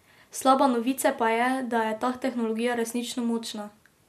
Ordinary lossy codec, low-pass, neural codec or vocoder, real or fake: MP3, 64 kbps; 14.4 kHz; none; real